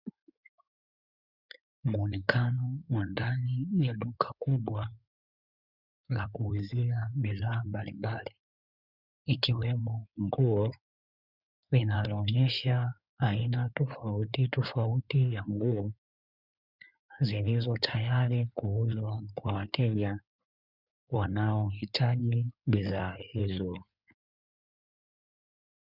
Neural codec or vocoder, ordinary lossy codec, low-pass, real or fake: codec, 16 kHz in and 24 kHz out, 2.2 kbps, FireRedTTS-2 codec; Opus, 64 kbps; 5.4 kHz; fake